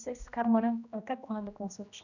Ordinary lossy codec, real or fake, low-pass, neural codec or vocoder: none; fake; 7.2 kHz; codec, 16 kHz, 1 kbps, X-Codec, HuBERT features, trained on general audio